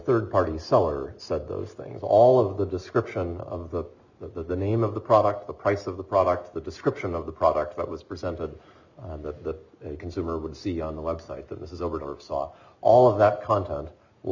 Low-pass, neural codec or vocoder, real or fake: 7.2 kHz; none; real